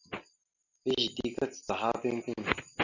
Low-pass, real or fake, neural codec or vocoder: 7.2 kHz; real; none